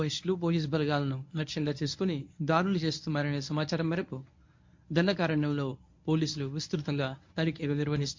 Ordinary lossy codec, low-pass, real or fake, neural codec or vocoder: MP3, 48 kbps; 7.2 kHz; fake; codec, 24 kHz, 0.9 kbps, WavTokenizer, medium speech release version 1